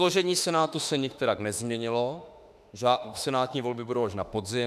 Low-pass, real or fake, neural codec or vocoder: 14.4 kHz; fake; autoencoder, 48 kHz, 32 numbers a frame, DAC-VAE, trained on Japanese speech